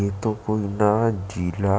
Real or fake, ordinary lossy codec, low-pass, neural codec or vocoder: real; none; none; none